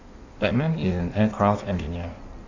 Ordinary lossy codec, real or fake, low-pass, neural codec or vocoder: none; fake; 7.2 kHz; codec, 16 kHz in and 24 kHz out, 1.1 kbps, FireRedTTS-2 codec